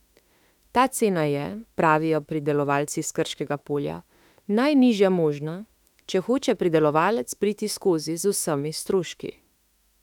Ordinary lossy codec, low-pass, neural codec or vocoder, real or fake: none; 19.8 kHz; autoencoder, 48 kHz, 32 numbers a frame, DAC-VAE, trained on Japanese speech; fake